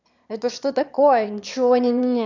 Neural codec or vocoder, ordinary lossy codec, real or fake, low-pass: autoencoder, 22.05 kHz, a latent of 192 numbers a frame, VITS, trained on one speaker; none; fake; 7.2 kHz